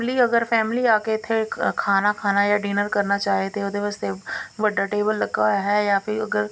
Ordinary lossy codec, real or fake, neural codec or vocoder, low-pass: none; real; none; none